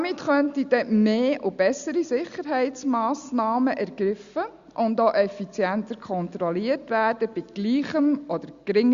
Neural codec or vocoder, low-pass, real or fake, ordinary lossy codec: none; 7.2 kHz; real; Opus, 64 kbps